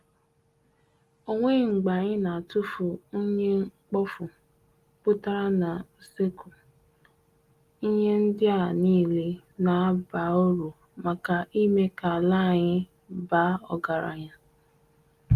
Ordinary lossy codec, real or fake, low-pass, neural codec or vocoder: Opus, 24 kbps; real; 14.4 kHz; none